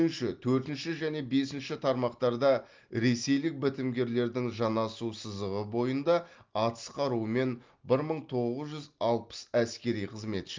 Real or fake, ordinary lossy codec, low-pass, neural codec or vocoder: real; Opus, 32 kbps; 7.2 kHz; none